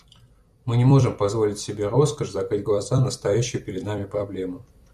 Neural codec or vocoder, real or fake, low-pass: vocoder, 44.1 kHz, 128 mel bands every 256 samples, BigVGAN v2; fake; 14.4 kHz